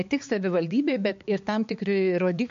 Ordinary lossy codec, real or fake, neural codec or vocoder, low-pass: MP3, 48 kbps; fake; codec, 16 kHz, 4 kbps, X-Codec, HuBERT features, trained on balanced general audio; 7.2 kHz